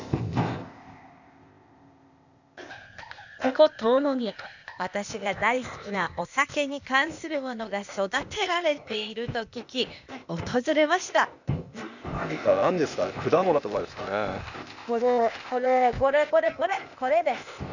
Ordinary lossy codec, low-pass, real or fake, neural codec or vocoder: none; 7.2 kHz; fake; codec, 16 kHz, 0.8 kbps, ZipCodec